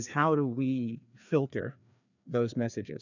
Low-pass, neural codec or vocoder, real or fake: 7.2 kHz; codec, 16 kHz, 2 kbps, FreqCodec, larger model; fake